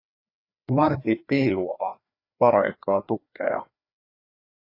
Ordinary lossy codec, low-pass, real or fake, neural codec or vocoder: AAC, 32 kbps; 5.4 kHz; fake; codec, 16 kHz, 2 kbps, FreqCodec, larger model